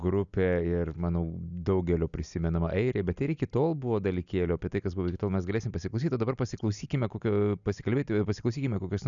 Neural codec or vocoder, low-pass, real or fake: none; 7.2 kHz; real